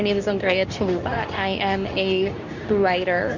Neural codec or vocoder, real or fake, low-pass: codec, 24 kHz, 0.9 kbps, WavTokenizer, medium speech release version 2; fake; 7.2 kHz